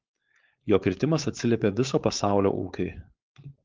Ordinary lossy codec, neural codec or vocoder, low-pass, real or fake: Opus, 32 kbps; codec, 16 kHz, 4.8 kbps, FACodec; 7.2 kHz; fake